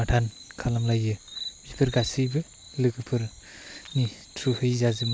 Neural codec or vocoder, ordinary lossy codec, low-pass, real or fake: none; none; none; real